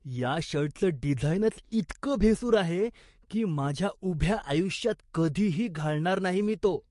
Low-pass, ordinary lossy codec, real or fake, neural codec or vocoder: 14.4 kHz; MP3, 48 kbps; fake; codec, 44.1 kHz, 7.8 kbps, DAC